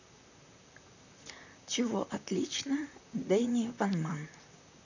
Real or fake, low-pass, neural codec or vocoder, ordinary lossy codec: fake; 7.2 kHz; vocoder, 44.1 kHz, 128 mel bands, Pupu-Vocoder; none